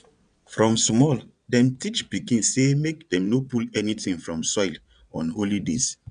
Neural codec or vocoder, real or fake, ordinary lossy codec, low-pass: vocoder, 22.05 kHz, 80 mel bands, Vocos; fake; none; 9.9 kHz